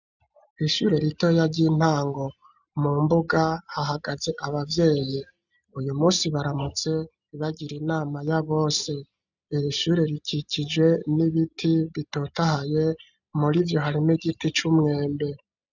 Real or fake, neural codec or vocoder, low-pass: real; none; 7.2 kHz